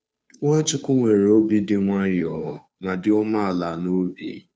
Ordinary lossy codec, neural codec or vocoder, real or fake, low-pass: none; codec, 16 kHz, 2 kbps, FunCodec, trained on Chinese and English, 25 frames a second; fake; none